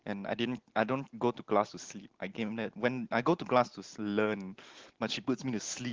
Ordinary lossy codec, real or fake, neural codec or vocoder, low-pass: Opus, 16 kbps; real; none; 7.2 kHz